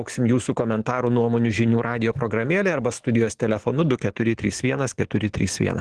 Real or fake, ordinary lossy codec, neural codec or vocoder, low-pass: fake; Opus, 16 kbps; codec, 44.1 kHz, 7.8 kbps, DAC; 10.8 kHz